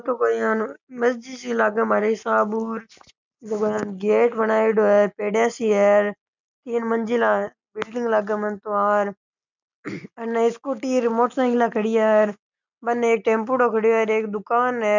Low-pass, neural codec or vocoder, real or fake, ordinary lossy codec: 7.2 kHz; none; real; none